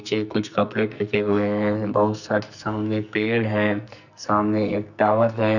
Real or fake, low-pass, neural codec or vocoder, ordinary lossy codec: fake; 7.2 kHz; codec, 44.1 kHz, 2.6 kbps, SNAC; none